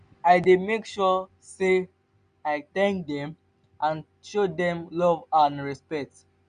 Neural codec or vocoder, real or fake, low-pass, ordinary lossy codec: none; real; 9.9 kHz; none